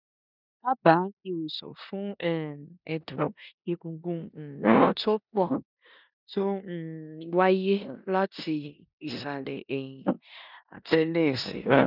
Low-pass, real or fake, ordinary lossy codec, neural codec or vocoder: 5.4 kHz; fake; none; codec, 16 kHz in and 24 kHz out, 0.9 kbps, LongCat-Audio-Codec, four codebook decoder